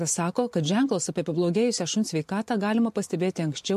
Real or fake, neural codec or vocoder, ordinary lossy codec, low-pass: fake; vocoder, 44.1 kHz, 128 mel bands every 512 samples, BigVGAN v2; MP3, 64 kbps; 14.4 kHz